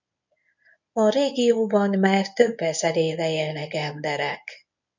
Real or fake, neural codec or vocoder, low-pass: fake; codec, 24 kHz, 0.9 kbps, WavTokenizer, medium speech release version 1; 7.2 kHz